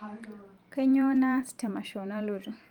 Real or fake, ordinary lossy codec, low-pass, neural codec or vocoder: fake; Opus, 32 kbps; 19.8 kHz; vocoder, 44.1 kHz, 128 mel bands every 512 samples, BigVGAN v2